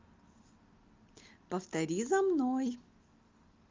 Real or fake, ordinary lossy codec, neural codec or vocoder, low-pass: real; Opus, 32 kbps; none; 7.2 kHz